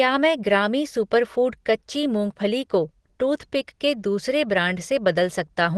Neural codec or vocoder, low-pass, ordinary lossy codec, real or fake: none; 10.8 kHz; Opus, 16 kbps; real